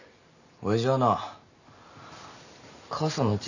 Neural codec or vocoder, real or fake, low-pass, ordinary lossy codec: none; real; 7.2 kHz; none